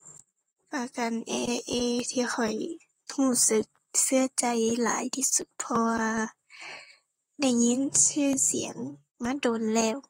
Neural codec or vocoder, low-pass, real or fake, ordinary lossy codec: vocoder, 44.1 kHz, 128 mel bands, Pupu-Vocoder; 19.8 kHz; fake; AAC, 48 kbps